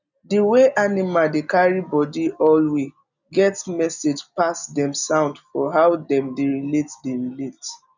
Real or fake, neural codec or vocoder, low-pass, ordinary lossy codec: real; none; 7.2 kHz; none